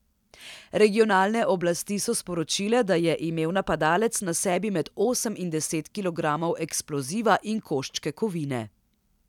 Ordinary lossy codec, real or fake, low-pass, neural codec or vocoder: none; real; 19.8 kHz; none